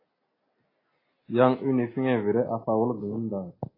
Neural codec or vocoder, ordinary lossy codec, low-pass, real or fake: none; AAC, 24 kbps; 5.4 kHz; real